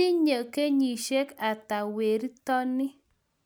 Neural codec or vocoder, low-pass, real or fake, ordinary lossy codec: none; none; real; none